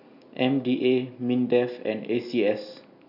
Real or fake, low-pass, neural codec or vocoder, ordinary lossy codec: real; 5.4 kHz; none; AAC, 48 kbps